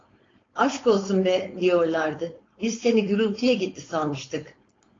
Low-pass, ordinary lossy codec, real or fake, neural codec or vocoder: 7.2 kHz; AAC, 32 kbps; fake; codec, 16 kHz, 4.8 kbps, FACodec